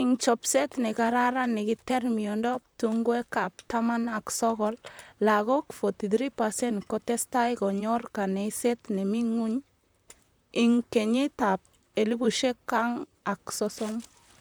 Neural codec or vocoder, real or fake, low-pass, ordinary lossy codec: vocoder, 44.1 kHz, 128 mel bands, Pupu-Vocoder; fake; none; none